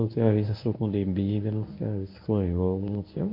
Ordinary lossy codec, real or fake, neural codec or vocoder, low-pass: MP3, 32 kbps; fake; codec, 24 kHz, 0.9 kbps, WavTokenizer, medium speech release version 1; 5.4 kHz